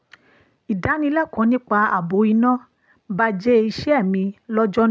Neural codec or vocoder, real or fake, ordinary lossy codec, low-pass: none; real; none; none